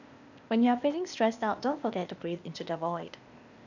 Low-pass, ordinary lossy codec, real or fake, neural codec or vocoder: 7.2 kHz; none; fake; codec, 16 kHz, 0.8 kbps, ZipCodec